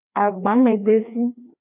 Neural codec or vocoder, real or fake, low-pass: codec, 16 kHz, 2 kbps, FreqCodec, larger model; fake; 3.6 kHz